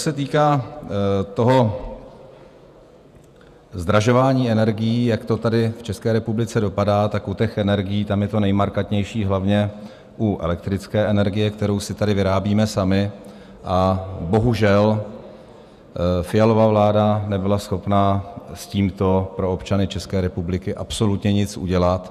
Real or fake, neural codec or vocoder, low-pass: fake; vocoder, 48 kHz, 128 mel bands, Vocos; 14.4 kHz